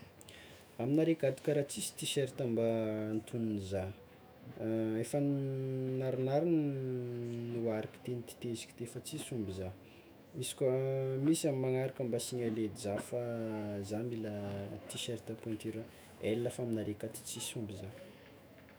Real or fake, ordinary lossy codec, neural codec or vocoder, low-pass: fake; none; autoencoder, 48 kHz, 128 numbers a frame, DAC-VAE, trained on Japanese speech; none